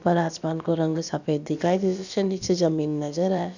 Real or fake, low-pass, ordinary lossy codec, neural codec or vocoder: fake; 7.2 kHz; none; codec, 16 kHz, about 1 kbps, DyCAST, with the encoder's durations